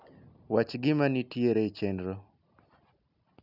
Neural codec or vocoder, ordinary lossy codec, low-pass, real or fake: none; none; 5.4 kHz; real